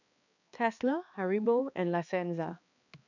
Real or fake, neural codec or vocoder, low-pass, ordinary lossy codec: fake; codec, 16 kHz, 2 kbps, X-Codec, HuBERT features, trained on balanced general audio; 7.2 kHz; none